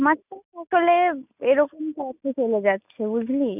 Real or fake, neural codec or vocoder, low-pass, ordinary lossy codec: real; none; 3.6 kHz; none